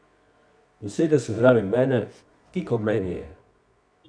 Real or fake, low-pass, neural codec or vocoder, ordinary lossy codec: fake; 9.9 kHz; codec, 24 kHz, 0.9 kbps, WavTokenizer, medium music audio release; none